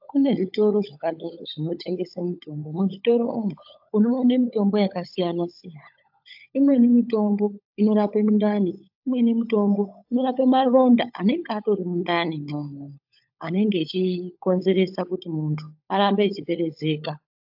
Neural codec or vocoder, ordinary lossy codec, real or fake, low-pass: codec, 16 kHz, 16 kbps, FunCodec, trained on LibriTTS, 50 frames a second; AAC, 48 kbps; fake; 5.4 kHz